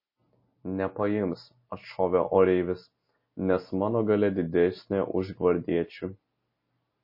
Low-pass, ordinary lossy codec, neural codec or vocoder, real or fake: 5.4 kHz; MP3, 24 kbps; none; real